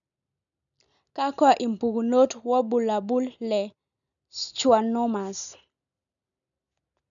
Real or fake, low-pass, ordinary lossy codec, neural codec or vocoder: real; 7.2 kHz; none; none